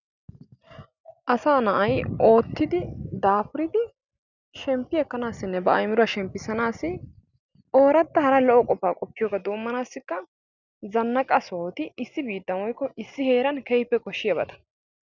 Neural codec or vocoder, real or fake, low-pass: none; real; 7.2 kHz